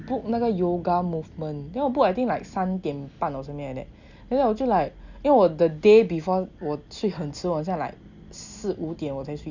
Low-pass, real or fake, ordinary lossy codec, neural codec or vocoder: 7.2 kHz; real; none; none